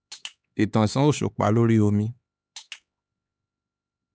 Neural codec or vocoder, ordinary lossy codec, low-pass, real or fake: codec, 16 kHz, 4 kbps, X-Codec, HuBERT features, trained on LibriSpeech; none; none; fake